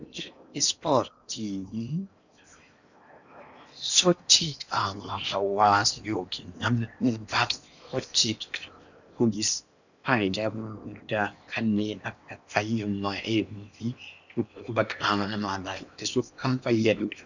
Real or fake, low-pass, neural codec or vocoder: fake; 7.2 kHz; codec, 16 kHz in and 24 kHz out, 0.8 kbps, FocalCodec, streaming, 65536 codes